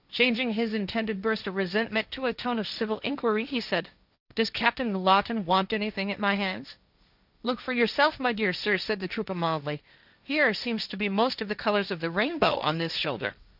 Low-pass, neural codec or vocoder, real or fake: 5.4 kHz; codec, 16 kHz, 1.1 kbps, Voila-Tokenizer; fake